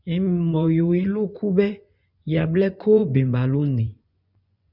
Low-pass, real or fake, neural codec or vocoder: 5.4 kHz; fake; vocoder, 24 kHz, 100 mel bands, Vocos